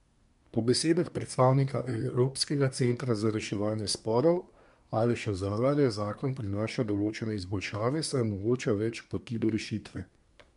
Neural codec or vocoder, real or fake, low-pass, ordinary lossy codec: codec, 24 kHz, 1 kbps, SNAC; fake; 10.8 kHz; MP3, 64 kbps